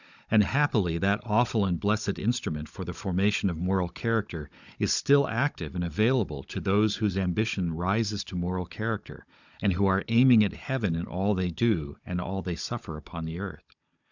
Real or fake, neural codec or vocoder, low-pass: fake; codec, 16 kHz, 16 kbps, FunCodec, trained on Chinese and English, 50 frames a second; 7.2 kHz